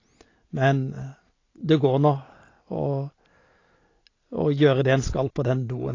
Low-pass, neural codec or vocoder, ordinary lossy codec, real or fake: 7.2 kHz; none; AAC, 32 kbps; real